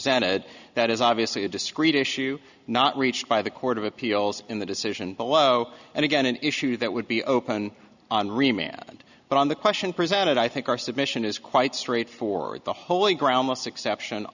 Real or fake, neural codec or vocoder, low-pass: real; none; 7.2 kHz